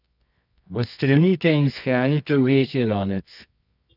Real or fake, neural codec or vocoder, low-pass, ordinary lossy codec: fake; codec, 24 kHz, 0.9 kbps, WavTokenizer, medium music audio release; 5.4 kHz; none